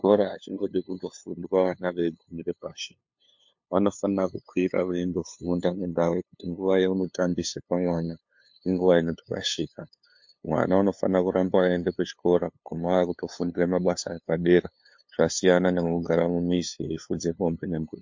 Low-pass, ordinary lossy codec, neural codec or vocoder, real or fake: 7.2 kHz; MP3, 48 kbps; codec, 16 kHz, 2 kbps, FunCodec, trained on LibriTTS, 25 frames a second; fake